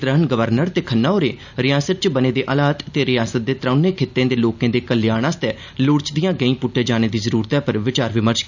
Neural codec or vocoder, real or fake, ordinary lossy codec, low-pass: none; real; none; 7.2 kHz